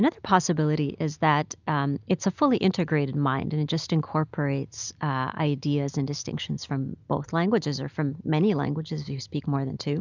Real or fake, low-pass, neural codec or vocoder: real; 7.2 kHz; none